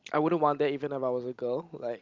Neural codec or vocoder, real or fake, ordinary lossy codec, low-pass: none; real; Opus, 32 kbps; 7.2 kHz